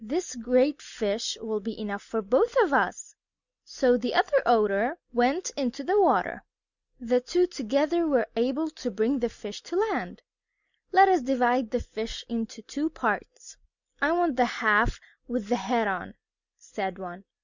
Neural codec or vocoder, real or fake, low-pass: none; real; 7.2 kHz